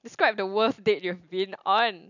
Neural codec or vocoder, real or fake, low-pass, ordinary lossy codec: none; real; 7.2 kHz; none